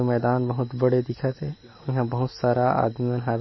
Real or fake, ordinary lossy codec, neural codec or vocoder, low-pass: real; MP3, 24 kbps; none; 7.2 kHz